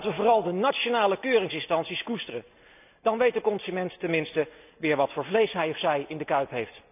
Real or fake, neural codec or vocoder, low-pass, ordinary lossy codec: real; none; 3.6 kHz; none